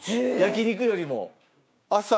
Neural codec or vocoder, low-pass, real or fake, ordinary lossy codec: codec, 16 kHz, 6 kbps, DAC; none; fake; none